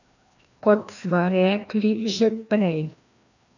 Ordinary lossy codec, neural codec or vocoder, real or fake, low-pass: none; codec, 16 kHz, 1 kbps, FreqCodec, larger model; fake; 7.2 kHz